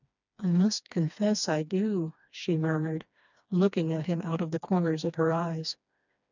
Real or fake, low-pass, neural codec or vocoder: fake; 7.2 kHz; codec, 16 kHz, 2 kbps, FreqCodec, smaller model